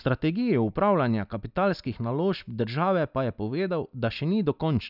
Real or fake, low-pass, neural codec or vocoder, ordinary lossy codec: real; 5.4 kHz; none; none